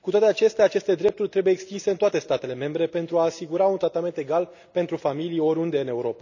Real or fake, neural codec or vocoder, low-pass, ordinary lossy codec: real; none; 7.2 kHz; none